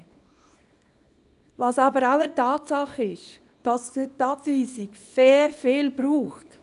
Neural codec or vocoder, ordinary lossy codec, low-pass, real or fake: codec, 24 kHz, 0.9 kbps, WavTokenizer, small release; none; 10.8 kHz; fake